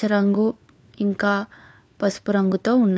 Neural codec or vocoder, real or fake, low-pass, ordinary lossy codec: codec, 16 kHz, 4 kbps, FunCodec, trained on LibriTTS, 50 frames a second; fake; none; none